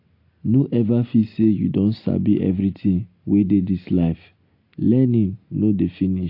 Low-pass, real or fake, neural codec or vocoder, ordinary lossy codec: 5.4 kHz; real; none; AAC, 32 kbps